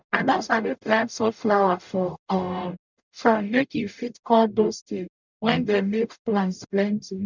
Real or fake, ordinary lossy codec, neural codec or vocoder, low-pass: fake; none; codec, 44.1 kHz, 0.9 kbps, DAC; 7.2 kHz